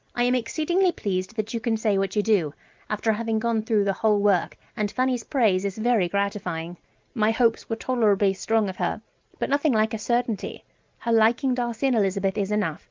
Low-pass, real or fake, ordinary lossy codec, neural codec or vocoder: 7.2 kHz; real; Opus, 32 kbps; none